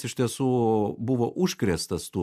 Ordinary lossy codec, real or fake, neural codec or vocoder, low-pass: MP3, 96 kbps; real; none; 14.4 kHz